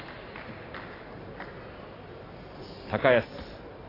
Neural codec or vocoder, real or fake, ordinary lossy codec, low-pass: none; real; AAC, 24 kbps; 5.4 kHz